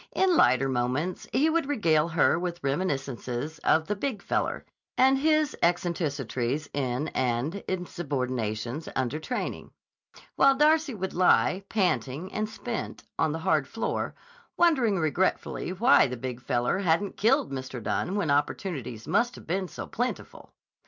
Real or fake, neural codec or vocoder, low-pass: real; none; 7.2 kHz